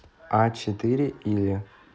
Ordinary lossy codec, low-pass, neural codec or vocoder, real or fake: none; none; none; real